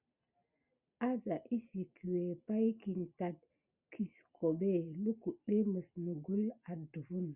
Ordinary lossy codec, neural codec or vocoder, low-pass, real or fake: Opus, 64 kbps; none; 3.6 kHz; real